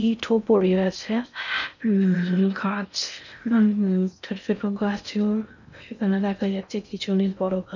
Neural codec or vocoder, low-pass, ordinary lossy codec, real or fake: codec, 16 kHz in and 24 kHz out, 0.6 kbps, FocalCodec, streaming, 4096 codes; 7.2 kHz; none; fake